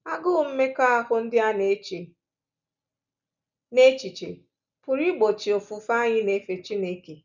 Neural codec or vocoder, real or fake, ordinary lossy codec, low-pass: none; real; none; 7.2 kHz